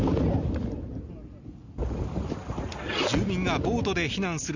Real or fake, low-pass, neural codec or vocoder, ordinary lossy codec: real; 7.2 kHz; none; none